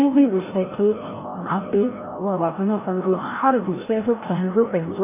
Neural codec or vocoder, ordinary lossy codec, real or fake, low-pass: codec, 16 kHz, 0.5 kbps, FreqCodec, larger model; MP3, 16 kbps; fake; 3.6 kHz